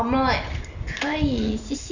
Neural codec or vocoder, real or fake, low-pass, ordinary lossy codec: none; real; 7.2 kHz; none